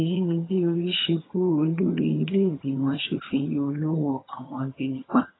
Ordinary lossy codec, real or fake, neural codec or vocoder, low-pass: AAC, 16 kbps; fake; vocoder, 22.05 kHz, 80 mel bands, HiFi-GAN; 7.2 kHz